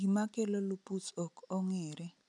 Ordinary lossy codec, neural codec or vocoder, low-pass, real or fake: none; none; 10.8 kHz; real